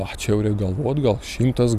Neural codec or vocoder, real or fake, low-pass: none; real; 14.4 kHz